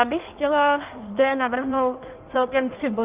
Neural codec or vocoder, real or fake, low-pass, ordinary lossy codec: codec, 16 kHz in and 24 kHz out, 1.1 kbps, FireRedTTS-2 codec; fake; 3.6 kHz; Opus, 32 kbps